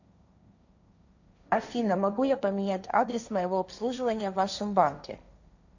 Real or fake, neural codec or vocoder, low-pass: fake; codec, 16 kHz, 1.1 kbps, Voila-Tokenizer; 7.2 kHz